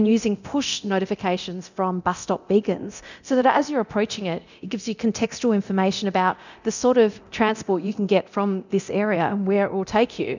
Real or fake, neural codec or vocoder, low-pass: fake; codec, 24 kHz, 0.9 kbps, DualCodec; 7.2 kHz